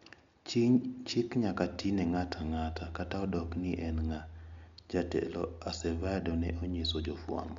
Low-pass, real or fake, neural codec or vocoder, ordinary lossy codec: 7.2 kHz; real; none; MP3, 64 kbps